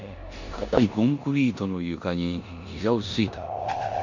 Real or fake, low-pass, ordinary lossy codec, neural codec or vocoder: fake; 7.2 kHz; none; codec, 16 kHz in and 24 kHz out, 0.9 kbps, LongCat-Audio-Codec, four codebook decoder